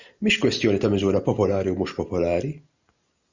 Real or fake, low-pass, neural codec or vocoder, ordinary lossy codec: real; 7.2 kHz; none; Opus, 64 kbps